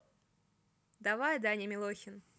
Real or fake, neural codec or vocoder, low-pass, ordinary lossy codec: real; none; none; none